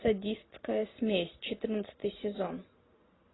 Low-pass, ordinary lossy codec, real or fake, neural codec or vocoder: 7.2 kHz; AAC, 16 kbps; real; none